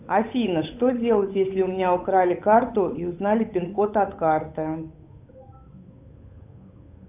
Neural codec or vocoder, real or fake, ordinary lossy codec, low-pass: codec, 16 kHz, 8 kbps, FunCodec, trained on Chinese and English, 25 frames a second; fake; AAC, 32 kbps; 3.6 kHz